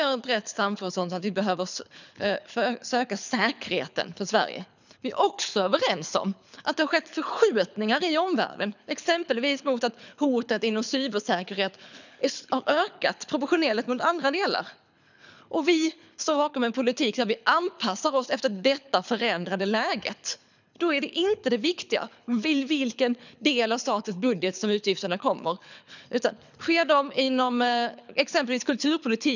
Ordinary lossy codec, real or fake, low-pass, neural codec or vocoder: none; fake; 7.2 kHz; codec, 24 kHz, 6 kbps, HILCodec